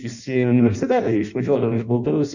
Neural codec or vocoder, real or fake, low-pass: codec, 16 kHz in and 24 kHz out, 0.6 kbps, FireRedTTS-2 codec; fake; 7.2 kHz